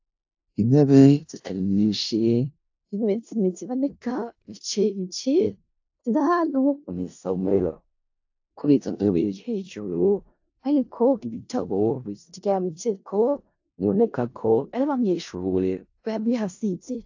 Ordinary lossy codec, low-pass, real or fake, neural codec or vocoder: AAC, 48 kbps; 7.2 kHz; fake; codec, 16 kHz in and 24 kHz out, 0.4 kbps, LongCat-Audio-Codec, four codebook decoder